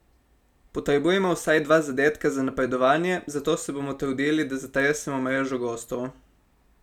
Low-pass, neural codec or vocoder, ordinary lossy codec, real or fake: 19.8 kHz; none; none; real